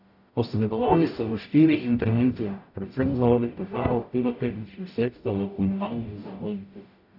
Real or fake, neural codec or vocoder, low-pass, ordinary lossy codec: fake; codec, 44.1 kHz, 0.9 kbps, DAC; 5.4 kHz; none